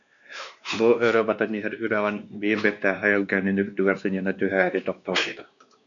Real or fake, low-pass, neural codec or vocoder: fake; 7.2 kHz; codec, 16 kHz, 2 kbps, X-Codec, WavLM features, trained on Multilingual LibriSpeech